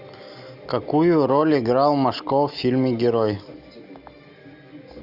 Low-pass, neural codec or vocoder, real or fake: 5.4 kHz; none; real